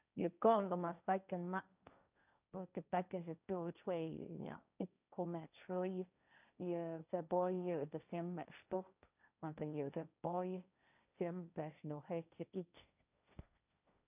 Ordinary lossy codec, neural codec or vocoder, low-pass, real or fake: none; codec, 16 kHz, 1.1 kbps, Voila-Tokenizer; 3.6 kHz; fake